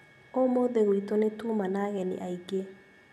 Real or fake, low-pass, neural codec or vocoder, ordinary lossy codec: real; 14.4 kHz; none; none